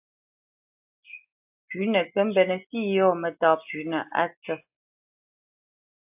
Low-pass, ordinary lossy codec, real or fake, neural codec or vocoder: 3.6 kHz; AAC, 32 kbps; real; none